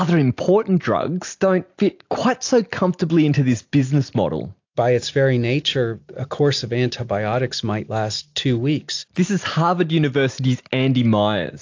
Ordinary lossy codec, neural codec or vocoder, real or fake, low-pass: AAC, 48 kbps; none; real; 7.2 kHz